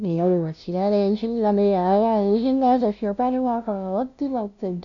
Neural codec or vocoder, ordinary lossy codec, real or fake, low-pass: codec, 16 kHz, 0.5 kbps, FunCodec, trained on LibriTTS, 25 frames a second; none; fake; 7.2 kHz